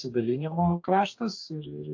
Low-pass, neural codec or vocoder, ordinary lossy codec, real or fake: 7.2 kHz; codec, 44.1 kHz, 2.6 kbps, DAC; AAC, 48 kbps; fake